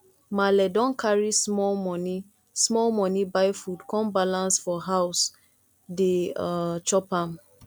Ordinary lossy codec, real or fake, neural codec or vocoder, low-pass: none; real; none; 19.8 kHz